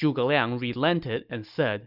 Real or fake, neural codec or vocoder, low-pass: real; none; 5.4 kHz